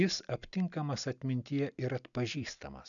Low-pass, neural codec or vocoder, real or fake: 7.2 kHz; none; real